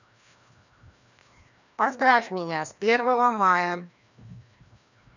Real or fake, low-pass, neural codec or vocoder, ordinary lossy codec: fake; 7.2 kHz; codec, 16 kHz, 1 kbps, FreqCodec, larger model; none